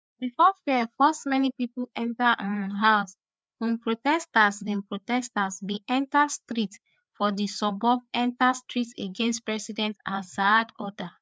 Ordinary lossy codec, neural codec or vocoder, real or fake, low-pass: none; codec, 16 kHz, 4 kbps, FreqCodec, larger model; fake; none